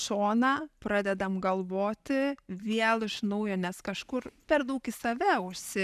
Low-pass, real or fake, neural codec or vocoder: 14.4 kHz; real; none